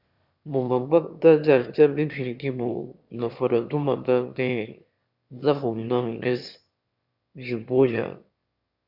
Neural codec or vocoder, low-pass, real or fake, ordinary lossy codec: autoencoder, 22.05 kHz, a latent of 192 numbers a frame, VITS, trained on one speaker; 5.4 kHz; fake; Opus, 64 kbps